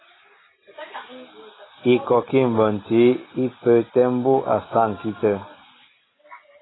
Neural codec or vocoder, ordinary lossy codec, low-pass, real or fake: none; AAC, 16 kbps; 7.2 kHz; real